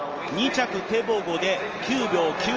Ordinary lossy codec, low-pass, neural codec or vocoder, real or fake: Opus, 24 kbps; 7.2 kHz; none; real